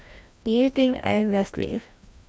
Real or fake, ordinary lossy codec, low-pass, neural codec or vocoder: fake; none; none; codec, 16 kHz, 1 kbps, FreqCodec, larger model